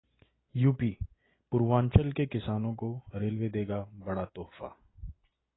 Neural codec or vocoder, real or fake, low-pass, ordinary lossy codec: none; real; 7.2 kHz; AAC, 16 kbps